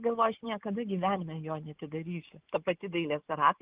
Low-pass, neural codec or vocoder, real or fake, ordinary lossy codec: 3.6 kHz; none; real; Opus, 32 kbps